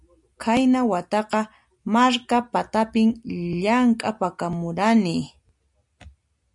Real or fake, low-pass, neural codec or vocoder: real; 10.8 kHz; none